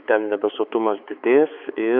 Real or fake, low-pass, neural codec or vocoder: fake; 5.4 kHz; codec, 16 kHz, 4 kbps, X-Codec, HuBERT features, trained on balanced general audio